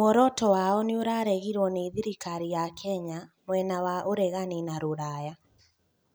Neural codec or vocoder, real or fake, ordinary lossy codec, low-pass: none; real; none; none